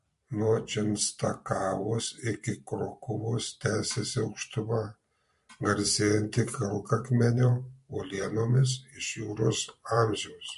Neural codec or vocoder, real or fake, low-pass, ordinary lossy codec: vocoder, 44.1 kHz, 128 mel bands every 512 samples, BigVGAN v2; fake; 14.4 kHz; MP3, 48 kbps